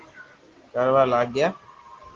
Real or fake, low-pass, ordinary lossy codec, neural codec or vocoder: real; 7.2 kHz; Opus, 16 kbps; none